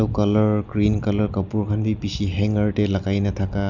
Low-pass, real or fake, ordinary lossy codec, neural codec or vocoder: 7.2 kHz; real; none; none